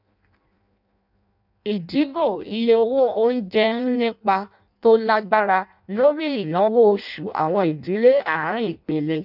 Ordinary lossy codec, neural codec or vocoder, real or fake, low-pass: none; codec, 16 kHz in and 24 kHz out, 0.6 kbps, FireRedTTS-2 codec; fake; 5.4 kHz